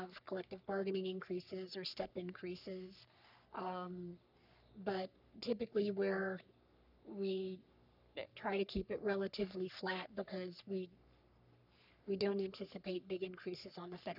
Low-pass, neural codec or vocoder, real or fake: 5.4 kHz; codec, 44.1 kHz, 3.4 kbps, Pupu-Codec; fake